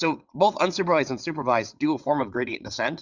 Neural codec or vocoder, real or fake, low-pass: vocoder, 22.05 kHz, 80 mel bands, WaveNeXt; fake; 7.2 kHz